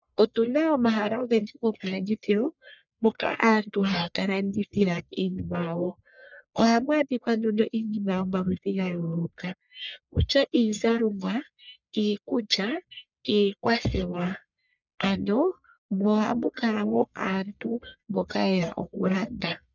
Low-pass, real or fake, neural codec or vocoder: 7.2 kHz; fake; codec, 44.1 kHz, 1.7 kbps, Pupu-Codec